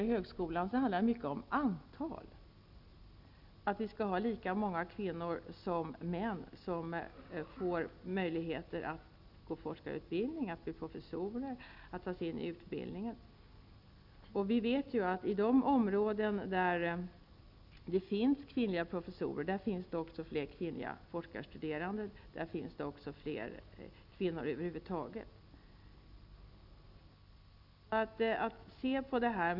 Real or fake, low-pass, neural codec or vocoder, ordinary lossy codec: real; 5.4 kHz; none; Opus, 64 kbps